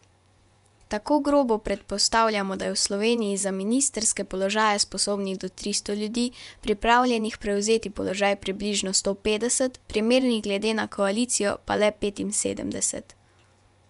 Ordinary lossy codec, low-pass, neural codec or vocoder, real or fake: none; 10.8 kHz; vocoder, 24 kHz, 100 mel bands, Vocos; fake